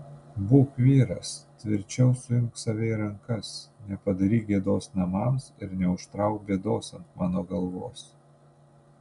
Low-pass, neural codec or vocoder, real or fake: 10.8 kHz; none; real